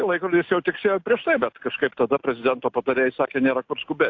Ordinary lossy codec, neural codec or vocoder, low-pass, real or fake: AAC, 48 kbps; none; 7.2 kHz; real